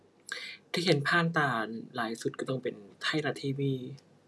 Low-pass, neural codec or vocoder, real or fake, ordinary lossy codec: none; none; real; none